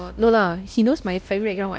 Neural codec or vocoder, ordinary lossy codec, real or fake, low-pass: codec, 16 kHz, 1 kbps, X-Codec, WavLM features, trained on Multilingual LibriSpeech; none; fake; none